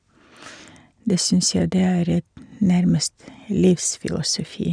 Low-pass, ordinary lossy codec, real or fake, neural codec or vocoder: 9.9 kHz; none; real; none